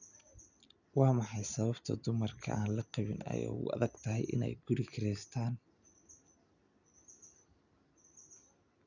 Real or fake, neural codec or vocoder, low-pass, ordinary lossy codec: real; none; 7.2 kHz; none